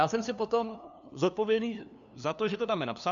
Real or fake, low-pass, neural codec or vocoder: fake; 7.2 kHz; codec, 16 kHz, 2 kbps, FunCodec, trained on LibriTTS, 25 frames a second